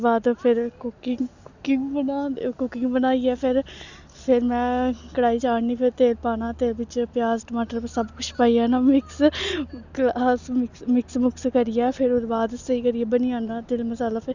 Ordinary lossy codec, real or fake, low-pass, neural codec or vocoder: none; real; 7.2 kHz; none